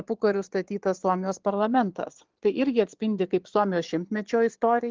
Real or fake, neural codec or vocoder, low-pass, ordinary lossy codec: fake; vocoder, 22.05 kHz, 80 mel bands, Vocos; 7.2 kHz; Opus, 16 kbps